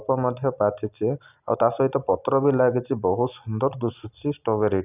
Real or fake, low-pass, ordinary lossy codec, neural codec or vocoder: real; 3.6 kHz; none; none